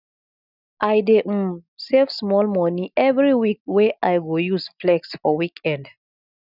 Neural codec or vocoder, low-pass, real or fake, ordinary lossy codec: none; 5.4 kHz; real; none